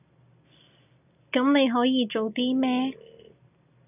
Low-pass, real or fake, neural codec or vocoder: 3.6 kHz; real; none